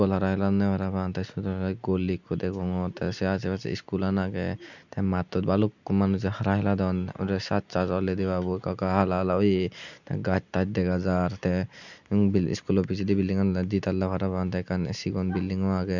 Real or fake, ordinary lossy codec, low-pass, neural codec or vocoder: real; none; 7.2 kHz; none